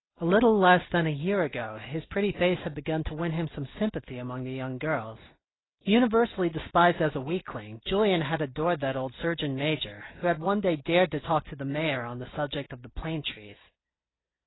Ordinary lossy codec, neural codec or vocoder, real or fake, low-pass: AAC, 16 kbps; none; real; 7.2 kHz